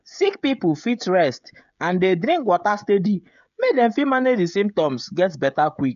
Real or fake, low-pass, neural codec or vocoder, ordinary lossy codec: fake; 7.2 kHz; codec, 16 kHz, 16 kbps, FreqCodec, smaller model; none